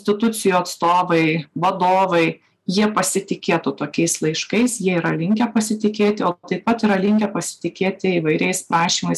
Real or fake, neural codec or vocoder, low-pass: real; none; 14.4 kHz